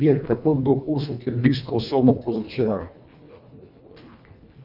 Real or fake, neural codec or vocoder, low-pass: fake; codec, 24 kHz, 1.5 kbps, HILCodec; 5.4 kHz